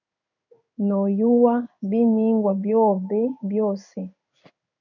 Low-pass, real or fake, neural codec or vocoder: 7.2 kHz; fake; codec, 16 kHz in and 24 kHz out, 1 kbps, XY-Tokenizer